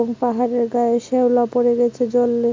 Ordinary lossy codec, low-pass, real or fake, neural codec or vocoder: MP3, 64 kbps; 7.2 kHz; real; none